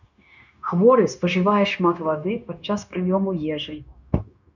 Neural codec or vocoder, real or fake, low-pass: codec, 16 kHz, 0.9 kbps, LongCat-Audio-Codec; fake; 7.2 kHz